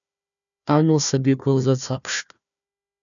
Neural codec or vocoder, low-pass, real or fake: codec, 16 kHz, 1 kbps, FunCodec, trained on Chinese and English, 50 frames a second; 7.2 kHz; fake